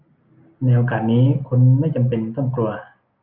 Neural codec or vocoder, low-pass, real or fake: none; 5.4 kHz; real